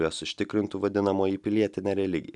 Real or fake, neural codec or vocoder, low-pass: real; none; 10.8 kHz